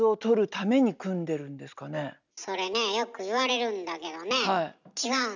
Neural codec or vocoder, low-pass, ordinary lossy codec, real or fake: none; 7.2 kHz; none; real